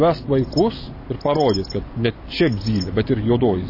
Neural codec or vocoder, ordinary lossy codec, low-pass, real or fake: none; MP3, 24 kbps; 5.4 kHz; real